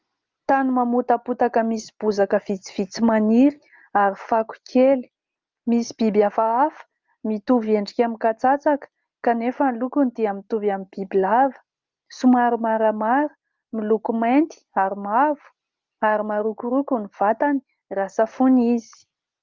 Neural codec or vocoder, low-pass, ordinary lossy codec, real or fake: none; 7.2 kHz; Opus, 24 kbps; real